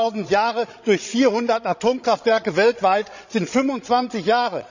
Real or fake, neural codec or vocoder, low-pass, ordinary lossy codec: fake; codec, 16 kHz, 16 kbps, FreqCodec, larger model; 7.2 kHz; none